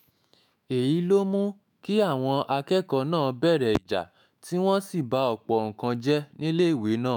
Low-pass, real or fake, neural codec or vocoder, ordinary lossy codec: none; fake; autoencoder, 48 kHz, 128 numbers a frame, DAC-VAE, trained on Japanese speech; none